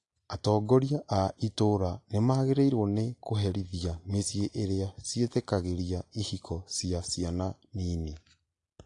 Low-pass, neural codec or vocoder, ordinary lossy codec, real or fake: 10.8 kHz; none; AAC, 48 kbps; real